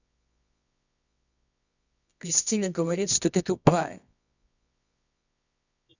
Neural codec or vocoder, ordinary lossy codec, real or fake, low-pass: codec, 24 kHz, 0.9 kbps, WavTokenizer, medium music audio release; none; fake; 7.2 kHz